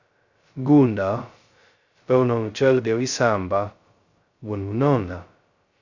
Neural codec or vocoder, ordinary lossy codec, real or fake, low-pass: codec, 16 kHz, 0.2 kbps, FocalCodec; Opus, 64 kbps; fake; 7.2 kHz